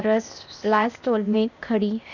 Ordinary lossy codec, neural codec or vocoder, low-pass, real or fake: none; codec, 16 kHz, 0.8 kbps, ZipCodec; 7.2 kHz; fake